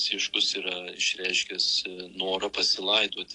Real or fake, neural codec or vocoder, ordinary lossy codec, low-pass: real; none; AAC, 48 kbps; 10.8 kHz